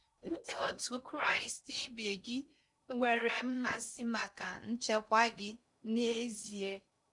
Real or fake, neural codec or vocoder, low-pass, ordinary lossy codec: fake; codec, 16 kHz in and 24 kHz out, 0.6 kbps, FocalCodec, streaming, 2048 codes; 10.8 kHz; MP3, 96 kbps